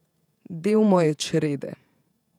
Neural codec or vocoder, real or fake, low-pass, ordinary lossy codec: vocoder, 48 kHz, 128 mel bands, Vocos; fake; 19.8 kHz; none